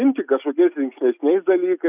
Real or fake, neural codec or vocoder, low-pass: real; none; 3.6 kHz